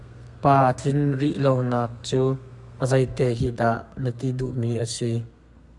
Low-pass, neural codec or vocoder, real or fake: 10.8 kHz; codec, 32 kHz, 1.9 kbps, SNAC; fake